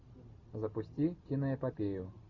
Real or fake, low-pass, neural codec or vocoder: real; 7.2 kHz; none